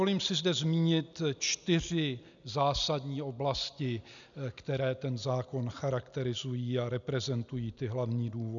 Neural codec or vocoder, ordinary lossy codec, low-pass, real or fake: none; MP3, 96 kbps; 7.2 kHz; real